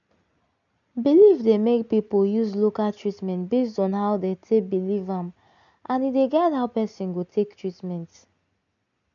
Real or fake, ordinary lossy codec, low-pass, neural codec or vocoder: real; none; 7.2 kHz; none